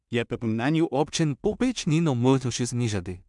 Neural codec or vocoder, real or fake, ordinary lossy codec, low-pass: codec, 16 kHz in and 24 kHz out, 0.4 kbps, LongCat-Audio-Codec, two codebook decoder; fake; MP3, 96 kbps; 10.8 kHz